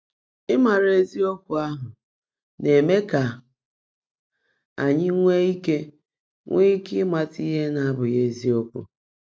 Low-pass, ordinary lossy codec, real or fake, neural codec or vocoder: none; none; real; none